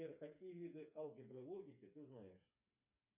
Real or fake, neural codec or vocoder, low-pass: fake; codec, 16 kHz, 4 kbps, FreqCodec, smaller model; 3.6 kHz